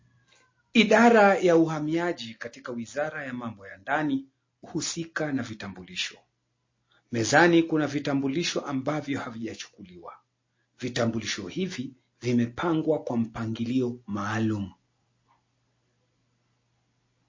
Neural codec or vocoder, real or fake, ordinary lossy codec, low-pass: none; real; MP3, 32 kbps; 7.2 kHz